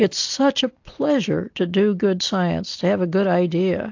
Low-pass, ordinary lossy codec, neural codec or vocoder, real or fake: 7.2 kHz; AAC, 48 kbps; none; real